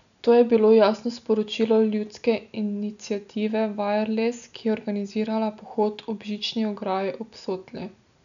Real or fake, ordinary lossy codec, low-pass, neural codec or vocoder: real; none; 7.2 kHz; none